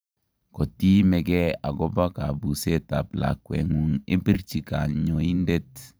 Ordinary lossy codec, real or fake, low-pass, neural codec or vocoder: none; fake; none; vocoder, 44.1 kHz, 128 mel bands every 512 samples, BigVGAN v2